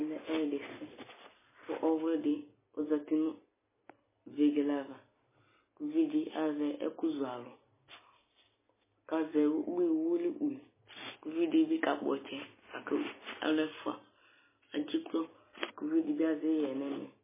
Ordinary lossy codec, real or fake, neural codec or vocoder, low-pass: MP3, 16 kbps; real; none; 3.6 kHz